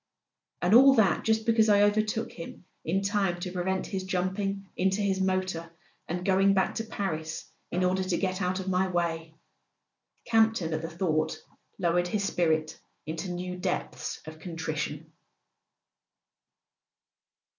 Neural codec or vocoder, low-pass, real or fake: none; 7.2 kHz; real